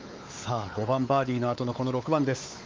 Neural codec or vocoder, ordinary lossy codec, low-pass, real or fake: codec, 16 kHz, 4 kbps, X-Codec, WavLM features, trained on Multilingual LibriSpeech; Opus, 32 kbps; 7.2 kHz; fake